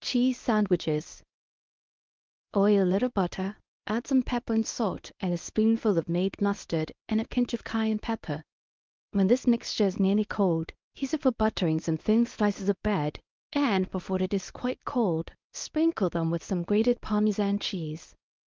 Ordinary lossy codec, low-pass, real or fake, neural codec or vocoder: Opus, 24 kbps; 7.2 kHz; fake; codec, 24 kHz, 0.9 kbps, WavTokenizer, medium speech release version 1